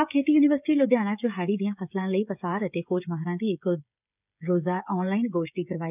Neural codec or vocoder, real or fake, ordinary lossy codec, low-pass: codec, 16 kHz, 16 kbps, FreqCodec, smaller model; fake; none; 3.6 kHz